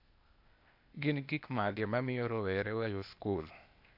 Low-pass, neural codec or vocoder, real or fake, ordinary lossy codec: 5.4 kHz; codec, 16 kHz, 0.8 kbps, ZipCodec; fake; AAC, 48 kbps